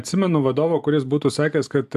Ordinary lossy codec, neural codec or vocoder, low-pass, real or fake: AAC, 96 kbps; none; 14.4 kHz; real